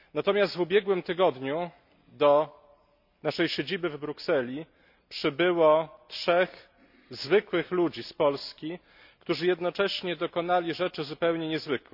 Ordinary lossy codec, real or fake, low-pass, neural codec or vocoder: none; real; 5.4 kHz; none